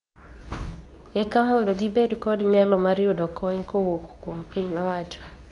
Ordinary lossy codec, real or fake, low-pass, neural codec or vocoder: none; fake; 10.8 kHz; codec, 24 kHz, 0.9 kbps, WavTokenizer, medium speech release version 2